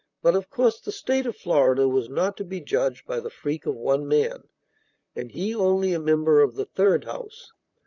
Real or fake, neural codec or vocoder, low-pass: fake; vocoder, 44.1 kHz, 128 mel bands, Pupu-Vocoder; 7.2 kHz